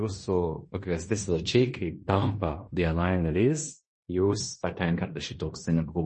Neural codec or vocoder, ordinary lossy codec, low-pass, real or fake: codec, 16 kHz in and 24 kHz out, 0.9 kbps, LongCat-Audio-Codec, fine tuned four codebook decoder; MP3, 32 kbps; 10.8 kHz; fake